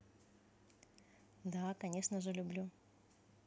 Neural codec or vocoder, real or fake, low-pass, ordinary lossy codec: none; real; none; none